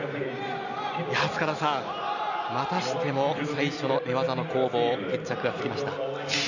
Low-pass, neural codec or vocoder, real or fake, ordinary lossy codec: 7.2 kHz; none; real; none